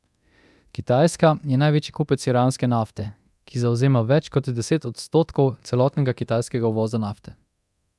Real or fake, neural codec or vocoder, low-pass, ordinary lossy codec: fake; codec, 24 kHz, 0.9 kbps, DualCodec; none; none